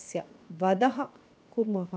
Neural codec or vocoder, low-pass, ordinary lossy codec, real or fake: codec, 16 kHz, 0.7 kbps, FocalCodec; none; none; fake